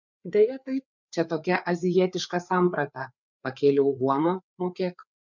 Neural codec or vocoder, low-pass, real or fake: codec, 16 kHz, 4 kbps, FreqCodec, larger model; 7.2 kHz; fake